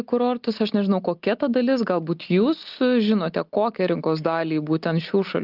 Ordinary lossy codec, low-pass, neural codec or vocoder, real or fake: Opus, 32 kbps; 5.4 kHz; none; real